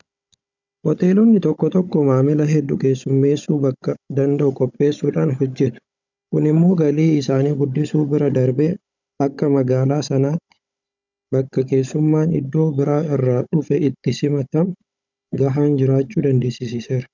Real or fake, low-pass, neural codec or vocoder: fake; 7.2 kHz; codec, 16 kHz, 16 kbps, FunCodec, trained on Chinese and English, 50 frames a second